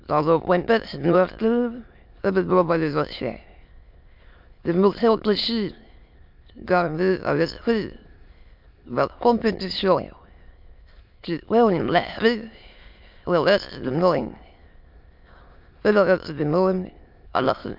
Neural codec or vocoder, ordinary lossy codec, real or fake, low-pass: autoencoder, 22.05 kHz, a latent of 192 numbers a frame, VITS, trained on many speakers; MP3, 48 kbps; fake; 5.4 kHz